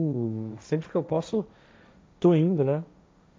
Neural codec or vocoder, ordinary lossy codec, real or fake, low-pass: codec, 16 kHz, 1.1 kbps, Voila-Tokenizer; none; fake; none